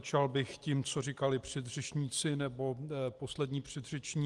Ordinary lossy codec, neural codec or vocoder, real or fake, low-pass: Opus, 24 kbps; none; real; 10.8 kHz